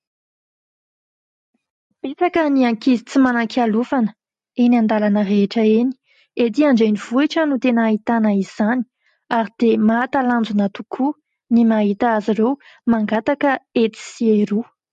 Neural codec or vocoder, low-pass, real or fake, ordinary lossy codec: none; 14.4 kHz; real; MP3, 48 kbps